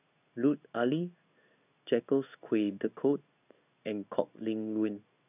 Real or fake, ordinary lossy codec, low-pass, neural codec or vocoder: fake; none; 3.6 kHz; codec, 16 kHz in and 24 kHz out, 1 kbps, XY-Tokenizer